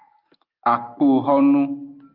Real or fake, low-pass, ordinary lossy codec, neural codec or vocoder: real; 5.4 kHz; Opus, 32 kbps; none